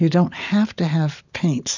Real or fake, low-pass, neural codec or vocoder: real; 7.2 kHz; none